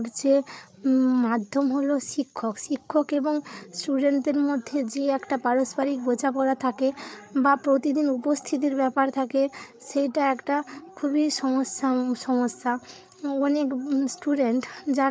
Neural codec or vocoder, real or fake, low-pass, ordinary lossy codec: codec, 16 kHz, 8 kbps, FreqCodec, larger model; fake; none; none